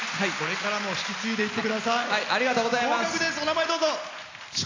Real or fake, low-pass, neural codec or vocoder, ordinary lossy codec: real; 7.2 kHz; none; AAC, 32 kbps